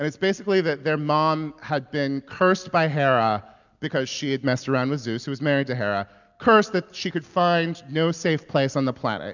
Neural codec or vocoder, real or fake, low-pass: none; real; 7.2 kHz